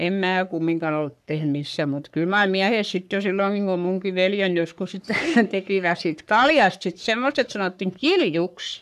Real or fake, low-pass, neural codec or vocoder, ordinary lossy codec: fake; 14.4 kHz; codec, 44.1 kHz, 3.4 kbps, Pupu-Codec; none